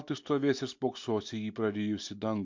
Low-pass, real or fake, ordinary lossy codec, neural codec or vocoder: 7.2 kHz; real; MP3, 48 kbps; none